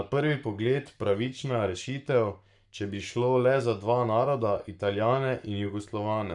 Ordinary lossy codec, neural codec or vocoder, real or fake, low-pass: none; codec, 44.1 kHz, 7.8 kbps, Pupu-Codec; fake; 10.8 kHz